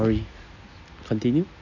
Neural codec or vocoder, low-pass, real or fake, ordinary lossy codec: none; 7.2 kHz; real; none